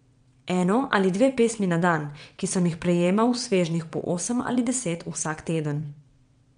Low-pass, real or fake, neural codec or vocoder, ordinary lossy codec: 9.9 kHz; fake; vocoder, 22.05 kHz, 80 mel bands, WaveNeXt; MP3, 64 kbps